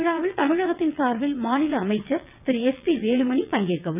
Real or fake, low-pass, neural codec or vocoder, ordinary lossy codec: fake; 3.6 kHz; vocoder, 22.05 kHz, 80 mel bands, WaveNeXt; none